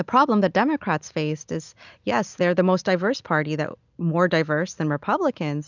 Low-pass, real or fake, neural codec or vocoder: 7.2 kHz; real; none